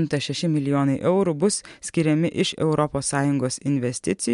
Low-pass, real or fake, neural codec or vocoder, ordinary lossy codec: 10.8 kHz; real; none; MP3, 64 kbps